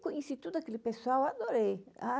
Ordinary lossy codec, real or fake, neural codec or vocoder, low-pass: none; real; none; none